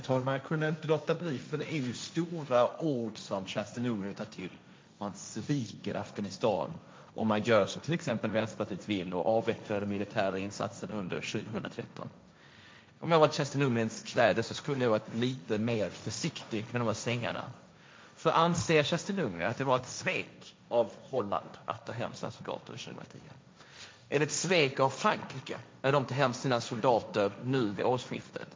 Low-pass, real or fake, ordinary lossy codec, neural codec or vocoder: none; fake; none; codec, 16 kHz, 1.1 kbps, Voila-Tokenizer